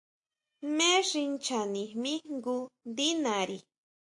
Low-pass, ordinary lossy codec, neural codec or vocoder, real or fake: 10.8 kHz; AAC, 64 kbps; none; real